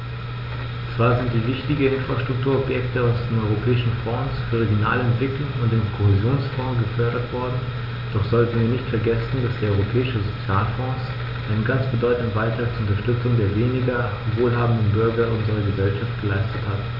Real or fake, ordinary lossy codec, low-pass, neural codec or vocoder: real; none; 5.4 kHz; none